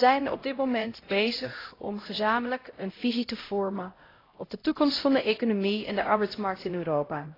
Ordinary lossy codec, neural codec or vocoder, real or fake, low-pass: AAC, 24 kbps; codec, 16 kHz, 0.5 kbps, X-Codec, HuBERT features, trained on LibriSpeech; fake; 5.4 kHz